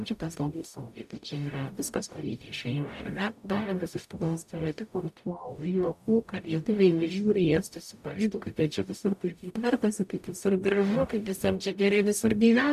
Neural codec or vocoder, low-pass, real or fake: codec, 44.1 kHz, 0.9 kbps, DAC; 14.4 kHz; fake